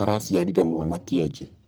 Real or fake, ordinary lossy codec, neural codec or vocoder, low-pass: fake; none; codec, 44.1 kHz, 1.7 kbps, Pupu-Codec; none